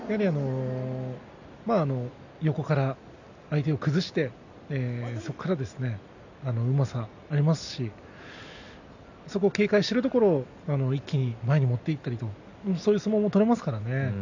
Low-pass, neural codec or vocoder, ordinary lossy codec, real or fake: 7.2 kHz; none; none; real